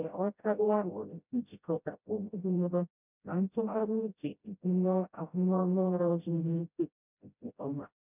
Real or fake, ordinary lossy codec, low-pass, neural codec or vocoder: fake; none; 3.6 kHz; codec, 16 kHz, 0.5 kbps, FreqCodec, smaller model